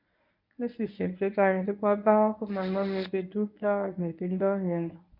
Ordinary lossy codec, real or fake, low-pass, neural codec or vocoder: none; fake; 5.4 kHz; codec, 24 kHz, 0.9 kbps, WavTokenizer, medium speech release version 1